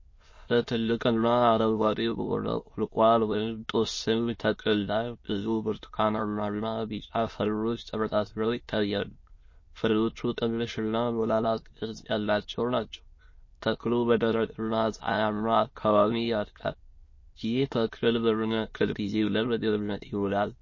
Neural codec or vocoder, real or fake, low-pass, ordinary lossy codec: autoencoder, 22.05 kHz, a latent of 192 numbers a frame, VITS, trained on many speakers; fake; 7.2 kHz; MP3, 32 kbps